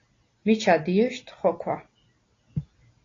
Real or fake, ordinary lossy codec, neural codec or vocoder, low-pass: real; AAC, 32 kbps; none; 7.2 kHz